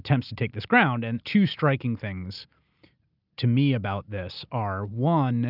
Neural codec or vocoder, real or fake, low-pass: none; real; 5.4 kHz